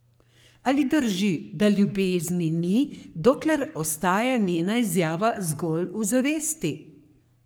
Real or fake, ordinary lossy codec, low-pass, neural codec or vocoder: fake; none; none; codec, 44.1 kHz, 3.4 kbps, Pupu-Codec